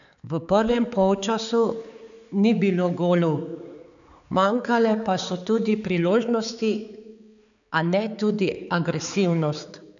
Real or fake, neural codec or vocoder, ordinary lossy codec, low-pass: fake; codec, 16 kHz, 4 kbps, X-Codec, HuBERT features, trained on balanced general audio; none; 7.2 kHz